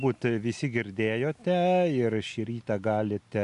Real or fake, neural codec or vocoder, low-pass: real; none; 10.8 kHz